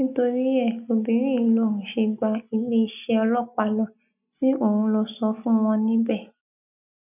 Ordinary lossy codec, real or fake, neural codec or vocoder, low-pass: none; real; none; 3.6 kHz